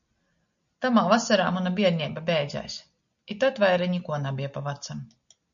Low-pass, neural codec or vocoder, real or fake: 7.2 kHz; none; real